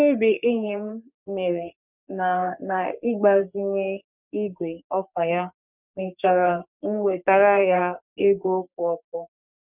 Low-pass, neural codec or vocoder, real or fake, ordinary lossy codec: 3.6 kHz; codec, 44.1 kHz, 3.4 kbps, Pupu-Codec; fake; none